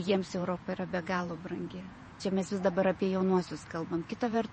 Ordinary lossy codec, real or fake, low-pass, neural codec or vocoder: MP3, 32 kbps; fake; 10.8 kHz; vocoder, 48 kHz, 128 mel bands, Vocos